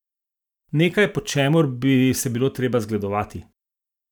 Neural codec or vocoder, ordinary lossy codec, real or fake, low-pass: vocoder, 44.1 kHz, 128 mel bands every 512 samples, BigVGAN v2; none; fake; 19.8 kHz